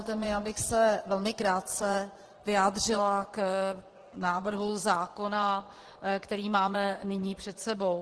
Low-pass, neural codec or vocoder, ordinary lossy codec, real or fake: 10.8 kHz; vocoder, 24 kHz, 100 mel bands, Vocos; Opus, 16 kbps; fake